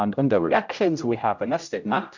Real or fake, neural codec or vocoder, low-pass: fake; codec, 16 kHz, 0.5 kbps, X-Codec, HuBERT features, trained on general audio; 7.2 kHz